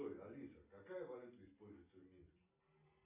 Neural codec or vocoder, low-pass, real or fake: none; 3.6 kHz; real